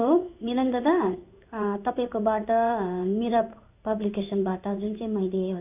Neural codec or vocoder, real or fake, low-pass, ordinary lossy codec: codec, 16 kHz in and 24 kHz out, 1 kbps, XY-Tokenizer; fake; 3.6 kHz; none